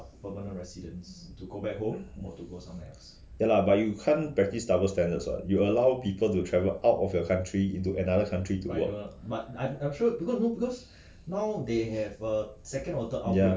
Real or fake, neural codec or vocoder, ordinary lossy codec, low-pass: real; none; none; none